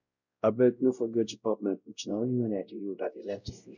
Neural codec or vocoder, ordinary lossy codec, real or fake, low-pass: codec, 16 kHz, 0.5 kbps, X-Codec, WavLM features, trained on Multilingual LibriSpeech; none; fake; 7.2 kHz